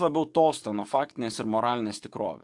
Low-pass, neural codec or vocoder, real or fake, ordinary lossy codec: 10.8 kHz; codec, 44.1 kHz, 7.8 kbps, DAC; fake; AAC, 64 kbps